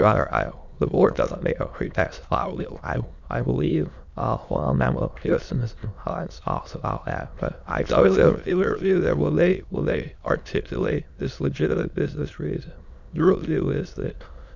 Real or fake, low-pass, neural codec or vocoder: fake; 7.2 kHz; autoencoder, 22.05 kHz, a latent of 192 numbers a frame, VITS, trained on many speakers